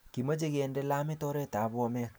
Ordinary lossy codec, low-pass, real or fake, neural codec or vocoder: none; none; real; none